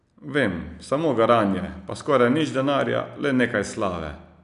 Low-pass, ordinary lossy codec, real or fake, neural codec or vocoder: 10.8 kHz; none; real; none